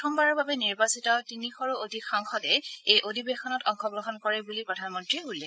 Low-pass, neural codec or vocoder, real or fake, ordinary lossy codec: none; codec, 16 kHz, 8 kbps, FreqCodec, larger model; fake; none